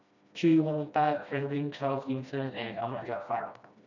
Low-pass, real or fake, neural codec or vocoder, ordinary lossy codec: 7.2 kHz; fake; codec, 16 kHz, 1 kbps, FreqCodec, smaller model; none